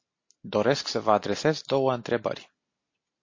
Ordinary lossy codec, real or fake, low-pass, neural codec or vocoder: MP3, 32 kbps; real; 7.2 kHz; none